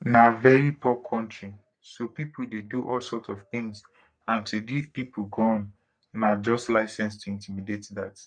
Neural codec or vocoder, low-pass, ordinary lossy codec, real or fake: codec, 44.1 kHz, 3.4 kbps, Pupu-Codec; 9.9 kHz; none; fake